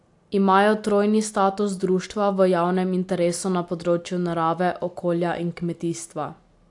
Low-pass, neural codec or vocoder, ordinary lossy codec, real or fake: 10.8 kHz; none; AAC, 64 kbps; real